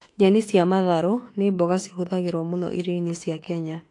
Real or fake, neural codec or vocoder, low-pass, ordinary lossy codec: fake; autoencoder, 48 kHz, 32 numbers a frame, DAC-VAE, trained on Japanese speech; 10.8 kHz; AAC, 48 kbps